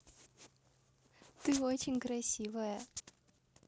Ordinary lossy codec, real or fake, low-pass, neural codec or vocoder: none; real; none; none